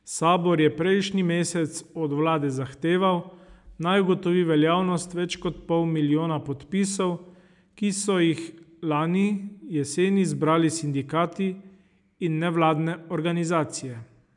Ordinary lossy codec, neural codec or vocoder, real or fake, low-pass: none; none; real; 10.8 kHz